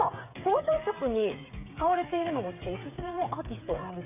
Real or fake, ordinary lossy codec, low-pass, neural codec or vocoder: fake; none; 3.6 kHz; codec, 16 kHz, 8 kbps, FreqCodec, smaller model